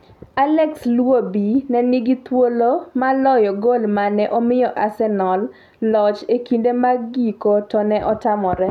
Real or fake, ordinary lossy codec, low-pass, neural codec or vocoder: real; none; 19.8 kHz; none